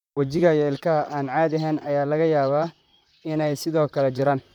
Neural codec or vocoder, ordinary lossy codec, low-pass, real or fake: vocoder, 44.1 kHz, 128 mel bands, Pupu-Vocoder; none; 19.8 kHz; fake